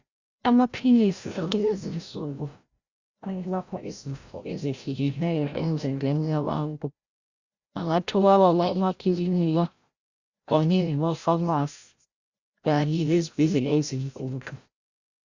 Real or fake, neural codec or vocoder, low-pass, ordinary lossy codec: fake; codec, 16 kHz, 0.5 kbps, FreqCodec, larger model; 7.2 kHz; Opus, 64 kbps